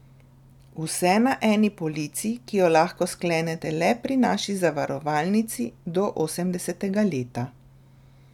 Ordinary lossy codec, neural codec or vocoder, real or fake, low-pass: none; none; real; 19.8 kHz